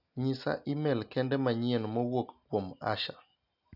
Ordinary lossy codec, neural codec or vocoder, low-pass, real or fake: none; none; 5.4 kHz; real